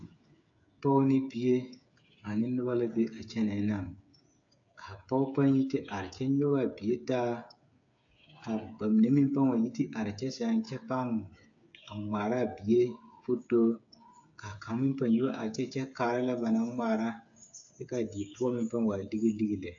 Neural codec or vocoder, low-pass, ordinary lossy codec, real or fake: codec, 16 kHz, 8 kbps, FreqCodec, smaller model; 7.2 kHz; AAC, 64 kbps; fake